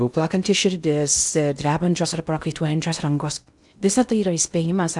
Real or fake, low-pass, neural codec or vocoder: fake; 10.8 kHz; codec, 16 kHz in and 24 kHz out, 0.6 kbps, FocalCodec, streaming, 2048 codes